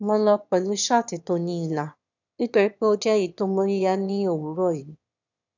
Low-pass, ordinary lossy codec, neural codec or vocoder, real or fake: 7.2 kHz; none; autoencoder, 22.05 kHz, a latent of 192 numbers a frame, VITS, trained on one speaker; fake